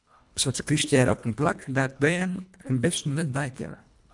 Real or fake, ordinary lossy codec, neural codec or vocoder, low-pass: fake; MP3, 96 kbps; codec, 24 kHz, 1.5 kbps, HILCodec; 10.8 kHz